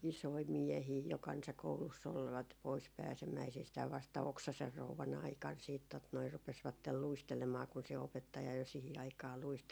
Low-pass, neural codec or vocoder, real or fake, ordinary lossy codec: none; none; real; none